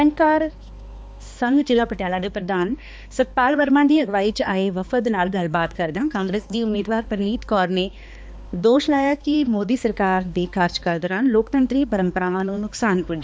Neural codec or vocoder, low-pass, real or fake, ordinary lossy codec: codec, 16 kHz, 2 kbps, X-Codec, HuBERT features, trained on balanced general audio; none; fake; none